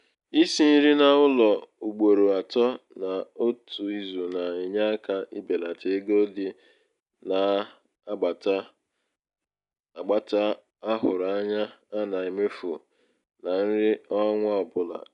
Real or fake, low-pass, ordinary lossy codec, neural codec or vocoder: real; 10.8 kHz; none; none